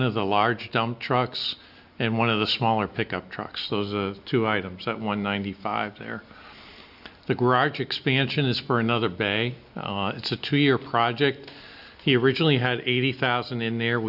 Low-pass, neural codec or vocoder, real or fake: 5.4 kHz; none; real